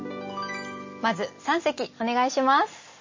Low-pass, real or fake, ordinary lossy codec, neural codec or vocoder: 7.2 kHz; real; MP3, 32 kbps; none